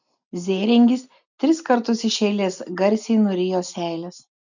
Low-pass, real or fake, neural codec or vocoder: 7.2 kHz; real; none